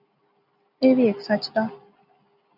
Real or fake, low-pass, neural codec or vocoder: real; 5.4 kHz; none